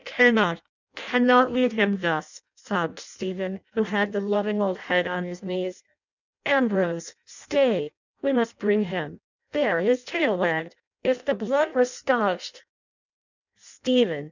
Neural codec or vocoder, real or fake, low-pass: codec, 16 kHz in and 24 kHz out, 0.6 kbps, FireRedTTS-2 codec; fake; 7.2 kHz